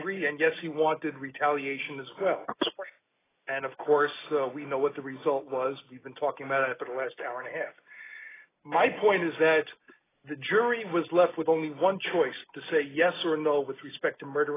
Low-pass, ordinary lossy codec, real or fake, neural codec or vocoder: 3.6 kHz; AAC, 16 kbps; real; none